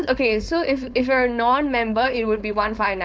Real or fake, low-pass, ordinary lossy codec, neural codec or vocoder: fake; none; none; codec, 16 kHz, 4.8 kbps, FACodec